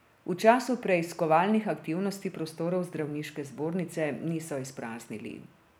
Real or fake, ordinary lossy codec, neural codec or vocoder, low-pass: real; none; none; none